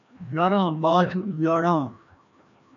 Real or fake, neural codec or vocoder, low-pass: fake; codec, 16 kHz, 1 kbps, FreqCodec, larger model; 7.2 kHz